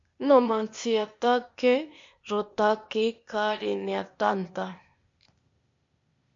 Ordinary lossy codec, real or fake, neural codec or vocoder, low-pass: MP3, 48 kbps; fake; codec, 16 kHz, 0.8 kbps, ZipCodec; 7.2 kHz